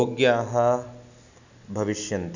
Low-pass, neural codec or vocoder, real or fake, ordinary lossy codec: 7.2 kHz; none; real; none